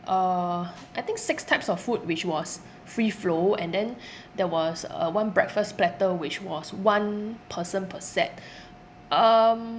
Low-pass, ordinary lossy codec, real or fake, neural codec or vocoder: none; none; real; none